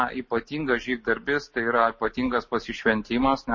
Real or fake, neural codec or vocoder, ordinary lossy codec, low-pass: real; none; MP3, 32 kbps; 7.2 kHz